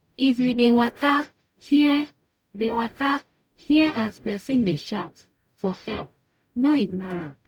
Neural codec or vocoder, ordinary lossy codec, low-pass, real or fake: codec, 44.1 kHz, 0.9 kbps, DAC; none; 19.8 kHz; fake